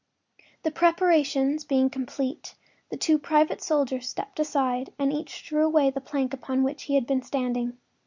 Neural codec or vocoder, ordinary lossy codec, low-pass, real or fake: none; Opus, 64 kbps; 7.2 kHz; real